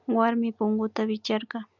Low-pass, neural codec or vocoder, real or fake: 7.2 kHz; none; real